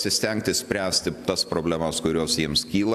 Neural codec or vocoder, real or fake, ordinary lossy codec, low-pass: none; real; AAC, 96 kbps; 14.4 kHz